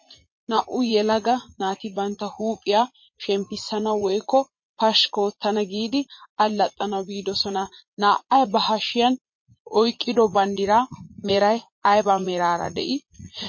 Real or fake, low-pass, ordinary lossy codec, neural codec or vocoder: fake; 7.2 kHz; MP3, 32 kbps; vocoder, 44.1 kHz, 80 mel bands, Vocos